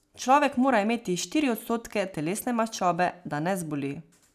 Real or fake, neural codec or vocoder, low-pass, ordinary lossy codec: real; none; 14.4 kHz; none